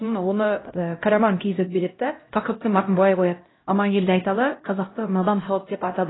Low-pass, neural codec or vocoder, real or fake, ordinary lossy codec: 7.2 kHz; codec, 16 kHz, 0.5 kbps, X-Codec, HuBERT features, trained on LibriSpeech; fake; AAC, 16 kbps